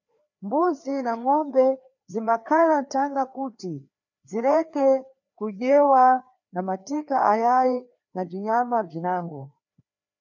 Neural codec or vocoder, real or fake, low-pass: codec, 16 kHz, 2 kbps, FreqCodec, larger model; fake; 7.2 kHz